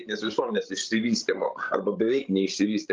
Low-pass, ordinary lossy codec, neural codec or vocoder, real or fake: 7.2 kHz; Opus, 32 kbps; codec, 16 kHz, 16 kbps, FreqCodec, larger model; fake